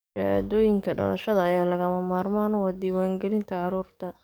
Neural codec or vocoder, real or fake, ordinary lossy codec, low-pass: codec, 44.1 kHz, 7.8 kbps, DAC; fake; none; none